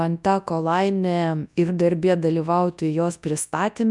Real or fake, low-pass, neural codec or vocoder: fake; 10.8 kHz; codec, 24 kHz, 0.9 kbps, WavTokenizer, large speech release